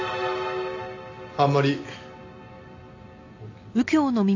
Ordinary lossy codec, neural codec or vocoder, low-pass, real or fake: AAC, 48 kbps; none; 7.2 kHz; real